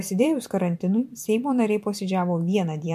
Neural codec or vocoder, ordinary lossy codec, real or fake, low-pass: vocoder, 44.1 kHz, 128 mel bands every 512 samples, BigVGAN v2; MP3, 64 kbps; fake; 14.4 kHz